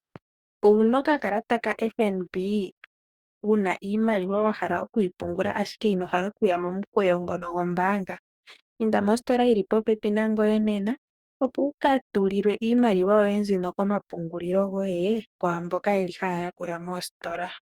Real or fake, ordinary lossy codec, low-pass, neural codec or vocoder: fake; Opus, 64 kbps; 19.8 kHz; codec, 44.1 kHz, 2.6 kbps, DAC